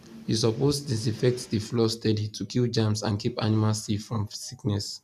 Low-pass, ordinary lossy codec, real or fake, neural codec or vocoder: 14.4 kHz; none; real; none